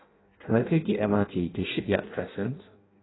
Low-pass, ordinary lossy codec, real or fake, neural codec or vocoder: 7.2 kHz; AAC, 16 kbps; fake; codec, 16 kHz in and 24 kHz out, 0.6 kbps, FireRedTTS-2 codec